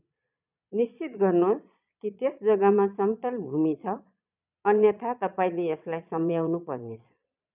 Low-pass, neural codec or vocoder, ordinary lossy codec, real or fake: 3.6 kHz; vocoder, 22.05 kHz, 80 mel bands, Vocos; none; fake